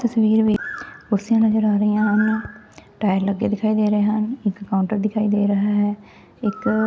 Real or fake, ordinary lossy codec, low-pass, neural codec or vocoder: real; none; none; none